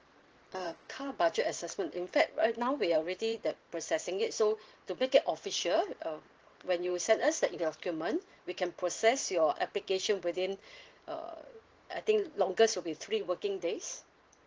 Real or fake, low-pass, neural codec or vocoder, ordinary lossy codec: fake; 7.2 kHz; vocoder, 44.1 kHz, 128 mel bands every 512 samples, BigVGAN v2; Opus, 16 kbps